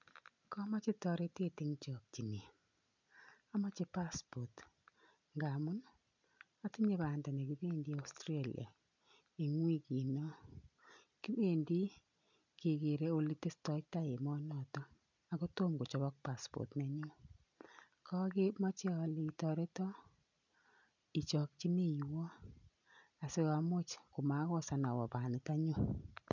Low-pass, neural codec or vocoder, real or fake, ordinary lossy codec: 7.2 kHz; none; real; none